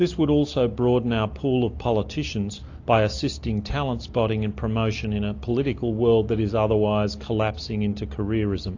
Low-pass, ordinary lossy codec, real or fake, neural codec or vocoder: 7.2 kHz; AAC, 48 kbps; real; none